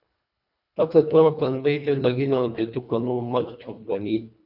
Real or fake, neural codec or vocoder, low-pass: fake; codec, 24 kHz, 1.5 kbps, HILCodec; 5.4 kHz